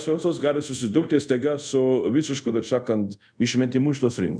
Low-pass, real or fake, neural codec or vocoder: 9.9 kHz; fake; codec, 24 kHz, 0.5 kbps, DualCodec